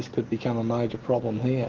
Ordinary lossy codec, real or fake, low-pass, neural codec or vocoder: Opus, 16 kbps; real; 7.2 kHz; none